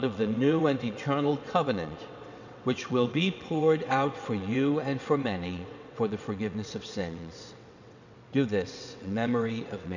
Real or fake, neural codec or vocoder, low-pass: fake; vocoder, 22.05 kHz, 80 mel bands, WaveNeXt; 7.2 kHz